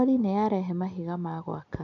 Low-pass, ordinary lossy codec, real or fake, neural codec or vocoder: 7.2 kHz; none; real; none